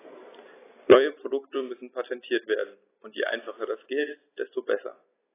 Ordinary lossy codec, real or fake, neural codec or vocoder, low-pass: AAC, 16 kbps; real; none; 3.6 kHz